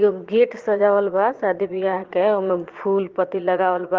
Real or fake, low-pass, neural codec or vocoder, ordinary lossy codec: fake; 7.2 kHz; vocoder, 22.05 kHz, 80 mel bands, Vocos; Opus, 16 kbps